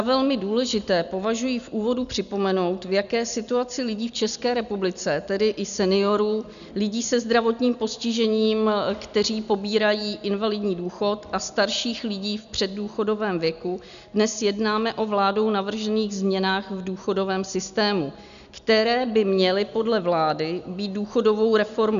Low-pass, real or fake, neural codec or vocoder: 7.2 kHz; real; none